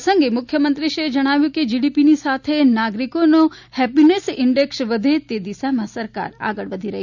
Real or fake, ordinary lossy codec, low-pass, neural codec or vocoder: real; none; 7.2 kHz; none